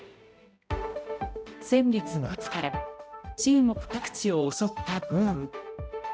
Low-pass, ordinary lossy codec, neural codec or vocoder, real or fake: none; none; codec, 16 kHz, 0.5 kbps, X-Codec, HuBERT features, trained on balanced general audio; fake